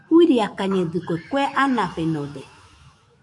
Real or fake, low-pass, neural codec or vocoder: fake; 10.8 kHz; autoencoder, 48 kHz, 128 numbers a frame, DAC-VAE, trained on Japanese speech